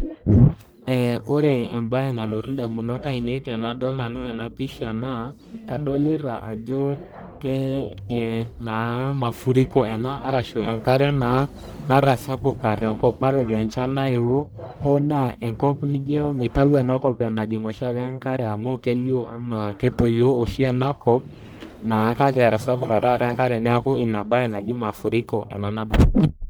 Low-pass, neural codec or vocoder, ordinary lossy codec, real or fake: none; codec, 44.1 kHz, 1.7 kbps, Pupu-Codec; none; fake